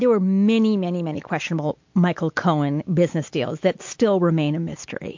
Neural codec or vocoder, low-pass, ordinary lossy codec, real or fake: none; 7.2 kHz; MP3, 48 kbps; real